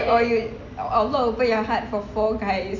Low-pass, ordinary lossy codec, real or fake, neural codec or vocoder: 7.2 kHz; none; real; none